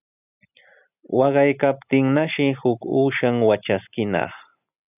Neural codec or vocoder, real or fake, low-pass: none; real; 3.6 kHz